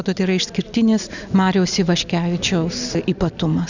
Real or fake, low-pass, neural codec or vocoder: real; 7.2 kHz; none